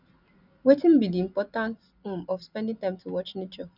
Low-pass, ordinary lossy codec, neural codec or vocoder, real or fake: 5.4 kHz; none; none; real